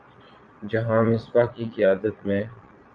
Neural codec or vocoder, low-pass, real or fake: vocoder, 22.05 kHz, 80 mel bands, Vocos; 9.9 kHz; fake